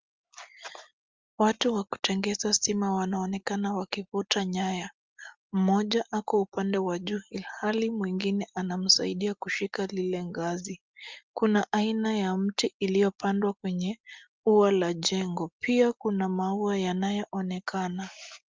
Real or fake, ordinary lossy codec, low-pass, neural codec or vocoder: real; Opus, 32 kbps; 7.2 kHz; none